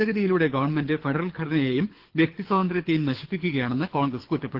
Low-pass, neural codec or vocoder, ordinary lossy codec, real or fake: 5.4 kHz; codec, 24 kHz, 6 kbps, HILCodec; Opus, 16 kbps; fake